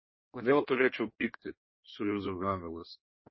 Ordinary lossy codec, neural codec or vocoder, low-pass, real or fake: MP3, 24 kbps; codec, 16 kHz in and 24 kHz out, 1.1 kbps, FireRedTTS-2 codec; 7.2 kHz; fake